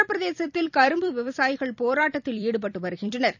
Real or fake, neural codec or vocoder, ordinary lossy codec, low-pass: fake; vocoder, 44.1 kHz, 128 mel bands every 256 samples, BigVGAN v2; none; 7.2 kHz